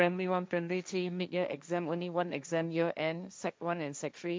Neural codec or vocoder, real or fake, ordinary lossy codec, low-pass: codec, 16 kHz, 1.1 kbps, Voila-Tokenizer; fake; none; none